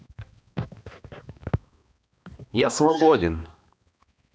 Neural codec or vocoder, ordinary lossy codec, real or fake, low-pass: codec, 16 kHz, 2 kbps, X-Codec, HuBERT features, trained on balanced general audio; none; fake; none